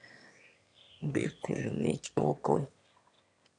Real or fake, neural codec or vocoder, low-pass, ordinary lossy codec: fake; autoencoder, 22.05 kHz, a latent of 192 numbers a frame, VITS, trained on one speaker; 9.9 kHz; none